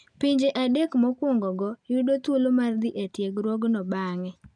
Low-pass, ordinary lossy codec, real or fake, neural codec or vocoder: 9.9 kHz; none; real; none